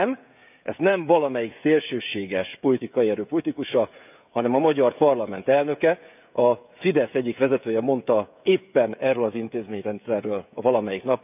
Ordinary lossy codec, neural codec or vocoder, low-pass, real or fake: none; codec, 16 kHz, 16 kbps, FreqCodec, smaller model; 3.6 kHz; fake